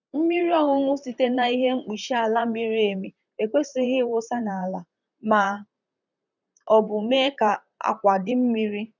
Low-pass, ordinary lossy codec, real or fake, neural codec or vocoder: 7.2 kHz; none; fake; vocoder, 44.1 kHz, 128 mel bands, Pupu-Vocoder